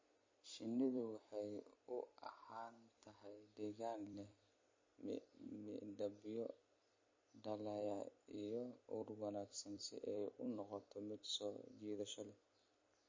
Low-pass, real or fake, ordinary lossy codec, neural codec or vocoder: 7.2 kHz; fake; MP3, 32 kbps; vocoder, 44.1 kHz, 128 mel bands every 256 samples, BigVGAN v2